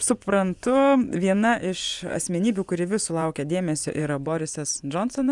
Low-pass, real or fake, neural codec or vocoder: 10.8 kHz; fake; vocoder, 24 kHz, 100 mel bands, Vocos